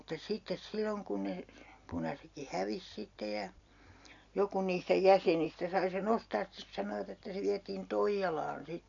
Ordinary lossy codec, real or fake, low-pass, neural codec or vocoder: MP3, 96 kbps; real; 7.2 kHz; none